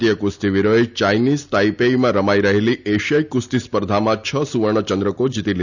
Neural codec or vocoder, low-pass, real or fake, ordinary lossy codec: none; 7.2 kHz; real; none